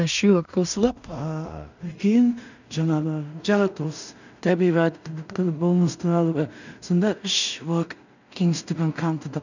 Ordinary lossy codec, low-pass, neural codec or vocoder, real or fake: none; 7.2 kHz; codec, 16 kHz in and 24 kHz out, 0.4 kbps, LongCat-Audio-Codec, two codebook decoder; fake